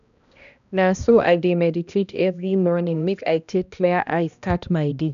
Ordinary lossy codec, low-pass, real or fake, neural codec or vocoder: none; 7.2 kHz; fake; codec, 16 kHz, 1 kbps, X-Codec, HuBERT features, trained on balanced general audio